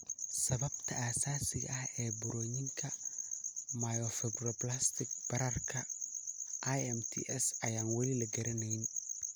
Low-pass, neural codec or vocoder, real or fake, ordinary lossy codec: none; none; real; none